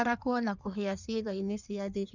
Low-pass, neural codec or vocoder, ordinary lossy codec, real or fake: 7.2 kHz; codec, 16 kHz in and 24 kHz out, 1.1 kbps, FireRedTTS-2 codec; none; fake